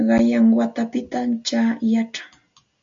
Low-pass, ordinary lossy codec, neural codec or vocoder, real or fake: 7.2 kHz; AAC, 64 kbps; none; real